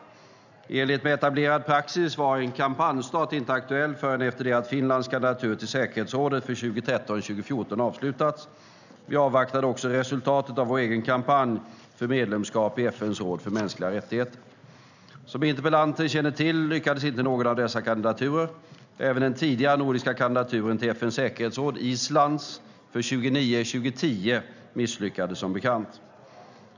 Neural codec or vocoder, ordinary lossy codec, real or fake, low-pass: none; none; real; 7.2 kHz